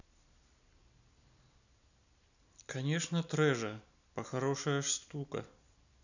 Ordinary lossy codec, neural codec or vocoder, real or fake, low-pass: none; none; real; 7.2 kHz